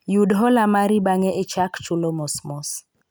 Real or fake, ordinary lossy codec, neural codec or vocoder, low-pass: real; none; none; none